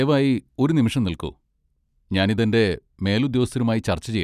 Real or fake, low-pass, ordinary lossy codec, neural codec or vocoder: real; 14.4 kHz; none; none